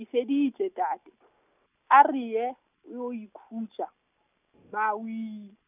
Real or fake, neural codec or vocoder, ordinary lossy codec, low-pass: real; none; none; 3.6 kHz